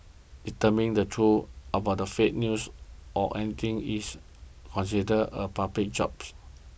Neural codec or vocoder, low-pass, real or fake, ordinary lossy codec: none; none; real; none